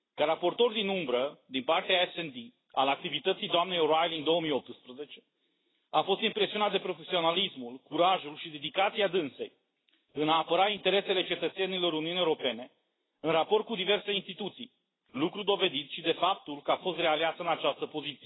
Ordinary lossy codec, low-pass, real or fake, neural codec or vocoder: AAC, 16 kbps; 7.2 kHz; real; none